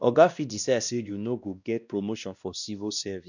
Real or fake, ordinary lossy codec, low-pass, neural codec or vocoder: fake; none; 7.2 kHz; codec, 16 kHz, 1 kbps, X-Codec, WavLM features, trained on Multilingual LibriSpeech